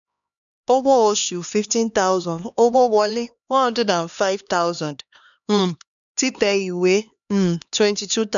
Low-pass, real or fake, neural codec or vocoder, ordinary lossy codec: 7.2 kHz; fake; codec, 16 kHz, 2 kbps, X-Codec, HuBERT features, trained on LibriSpeech; none